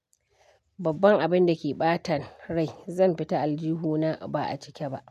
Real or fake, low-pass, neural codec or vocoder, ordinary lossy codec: real; 14.4 kHz; none; none